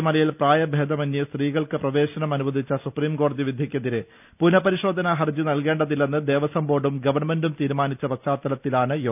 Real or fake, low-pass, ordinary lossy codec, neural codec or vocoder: real; 3.6 kHz; none; none